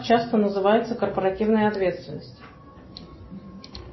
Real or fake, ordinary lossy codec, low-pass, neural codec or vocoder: real; MP3, 24 kbps; 7.2 kHz; none